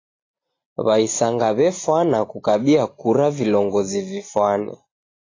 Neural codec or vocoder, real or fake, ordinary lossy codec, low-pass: none; real; AAC, 32 kbps; 7.2 kHz